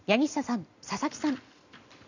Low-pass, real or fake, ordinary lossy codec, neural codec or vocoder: 7.2 kHz; real; none; none